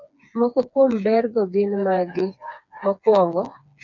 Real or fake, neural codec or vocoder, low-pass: fake; codec, 16 kHz, 4 kbps, FreqCodec, smaller model; 7.2 kHz